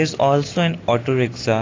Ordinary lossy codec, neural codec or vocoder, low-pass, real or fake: AAC, 32 kbps; none; 7.2 kHz; real